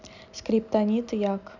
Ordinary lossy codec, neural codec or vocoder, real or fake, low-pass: none; none; real; 7.2 kHz